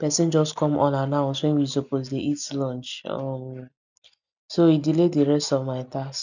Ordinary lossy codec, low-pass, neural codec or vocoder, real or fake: none; 7.2 kHz; none; real